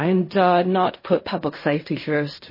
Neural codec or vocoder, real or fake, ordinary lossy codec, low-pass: codec, 16 kHz in and 24 kHz out, 0.4 kbps, LongCat-Audio-Codec, fine tuned four codebook decoder; fake; MP3, 24 kbps; 5.4 kHz